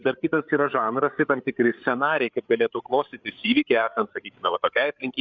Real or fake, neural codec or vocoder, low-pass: fake; codec, 16 kHz, 8 kbps, FreqCodec, larger model; 7.2 kHz